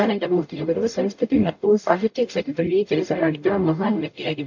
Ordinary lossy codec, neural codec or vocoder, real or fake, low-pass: AAC, 48 kbps; codec, 44.1 kHz, 0.9 kbps, DAC; fake; 7.2 kHz